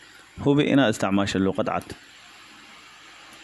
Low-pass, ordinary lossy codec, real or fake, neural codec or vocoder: 14.4 kHz; none; real; none